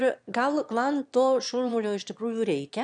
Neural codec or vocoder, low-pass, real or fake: autoencoder, 22.05 kHz, a latent of 192 numbers a frame, VITS, trained on one speaker; 9.9 kHz; fake